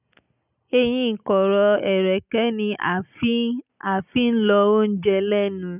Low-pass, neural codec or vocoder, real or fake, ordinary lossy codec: 3.6 kHz; none; real; none